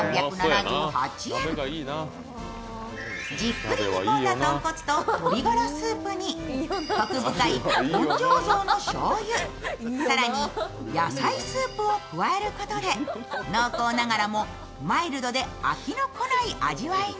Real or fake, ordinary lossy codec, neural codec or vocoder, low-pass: real; none; none; none